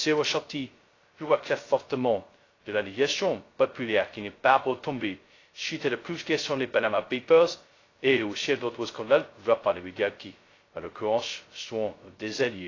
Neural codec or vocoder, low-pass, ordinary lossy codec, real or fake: codec, 16 kHz, 0.2 kbps, FocalCodec; 7.2 kHz; AAC, 32 kbps; fake